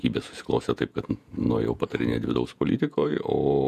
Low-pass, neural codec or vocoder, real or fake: 14.4 kHz; vocoder, 48 kHz, 128 mel bands, Vocos; fake